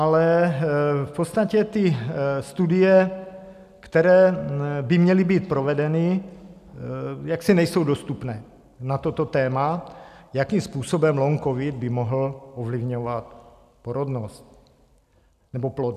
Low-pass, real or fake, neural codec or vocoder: 14.4 kHz; real; none